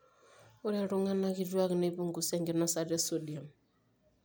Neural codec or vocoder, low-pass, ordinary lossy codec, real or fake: none; none; none; real